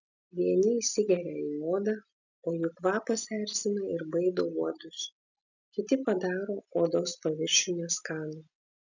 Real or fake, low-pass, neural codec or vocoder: real; 7.2 kHz; none